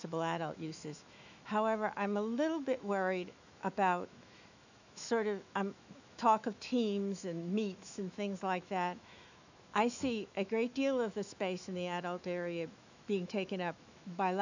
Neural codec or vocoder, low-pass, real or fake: autoencoder, 48 kHz, 128 numbers a frame, DAC-VAE, trained on Japanese speech; 7.2 kHz; fake